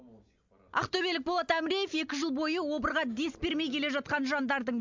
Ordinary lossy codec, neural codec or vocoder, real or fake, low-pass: MP3, 64 kbps; none; real; 7.2 kHz